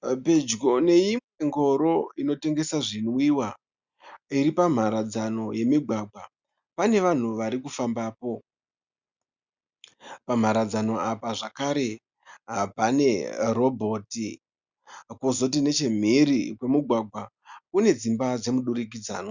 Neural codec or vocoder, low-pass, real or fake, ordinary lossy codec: none; 7.2 kHz; real; Opus, 64 kbps